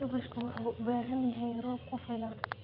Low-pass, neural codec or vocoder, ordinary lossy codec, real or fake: 5.4 kHz; vocoder, 22.05 kHz, 80 mel bands, WaveNeXt; none; fake